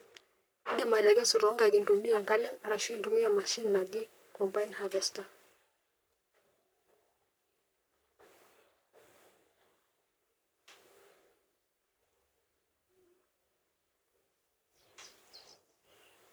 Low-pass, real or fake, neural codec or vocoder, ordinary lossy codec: none; fake; codec, 44.1 kHz, 3.4 kbps, Pupu-Codec; none